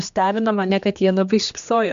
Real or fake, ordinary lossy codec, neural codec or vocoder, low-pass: fake; MP3, 64 kbps; codec, 16 kHz, 2 kbps, X-Codec, HuBERT features, trained on general audio; 7.2 kHz